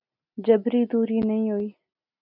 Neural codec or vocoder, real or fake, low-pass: none; real; 5.4 kHz